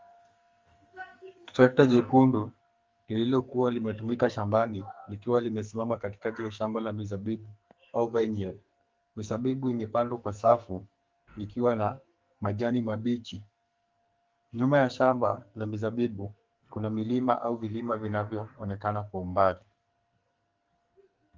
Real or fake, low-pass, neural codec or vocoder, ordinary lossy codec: fake; 7.2 kHz; codec, 44.1 kHz, 2.6 kbps, SNAC; Opus, 32 kbps